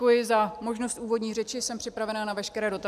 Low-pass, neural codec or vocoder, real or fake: 14.4 kHz; none; real